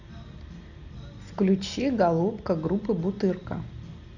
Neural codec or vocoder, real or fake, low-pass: none; real; 7.2 kHz